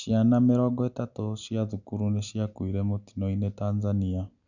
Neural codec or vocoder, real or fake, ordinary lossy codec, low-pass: none; real; none; 7.2 kHz